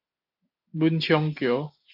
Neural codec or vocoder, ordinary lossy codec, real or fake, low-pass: none; AAC, 48 kbps; real; 5.4 kHz